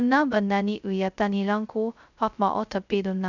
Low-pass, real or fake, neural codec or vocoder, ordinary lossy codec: 7.2 kHz; fake; codec, 16 kHz, 0.2 kbps, FocalCodec; none